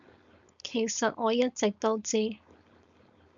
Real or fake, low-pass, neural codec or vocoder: fake; 7.2 kHz; codec, 16 kHz, 4.8 kbps, FACodec